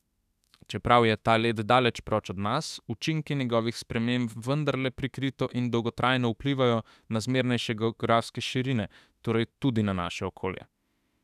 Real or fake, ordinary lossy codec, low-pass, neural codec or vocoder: fake; none; 14.4 kHz; autoencoder, 48 kHz, 32 numbers a frame, DAC-VAE, trained on Japanese speech